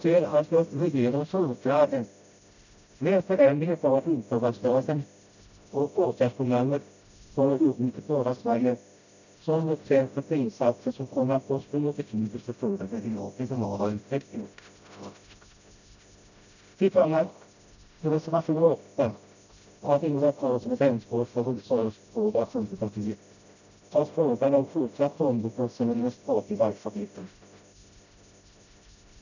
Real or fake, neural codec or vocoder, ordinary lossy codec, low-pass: fake; codec, 16 kHz, 0.5 kbps, FreqCodec, smaller model; none; 7.2 kHz